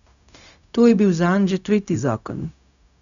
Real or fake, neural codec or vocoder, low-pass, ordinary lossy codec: fake; codec, 16 kHz, 0.4 kbps, LongCat-Audio-Codec; 7.2 kHz; none